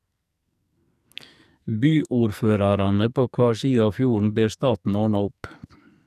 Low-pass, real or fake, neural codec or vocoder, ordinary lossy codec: 14.4 kHz; fake; codec, 44.1 kHz, 2.6 kbps, SNAC; none